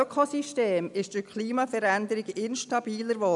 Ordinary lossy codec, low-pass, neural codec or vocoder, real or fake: none; 10.8 kHz; none; real